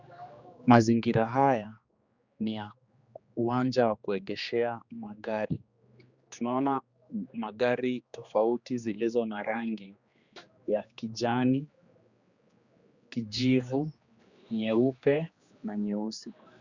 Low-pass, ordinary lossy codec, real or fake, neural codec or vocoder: 7.2 kHz; Opus, 64 kbps; fake; codec, 16 kHz, 2 kbps, X-Codec, HuBERT features, trained on general audio